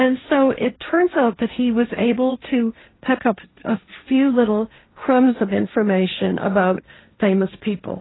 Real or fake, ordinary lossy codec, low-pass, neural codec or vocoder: fake; AAC, 16 kbps; 7.2 kHz; codec, 16 kHz, 1.1 kbps, Voila-Tokenizer